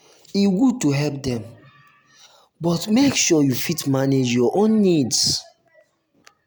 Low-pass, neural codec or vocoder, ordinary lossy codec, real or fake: none; none; none; real